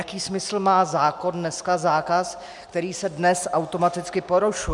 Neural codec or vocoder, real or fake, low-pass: none; real; 10.8 kHz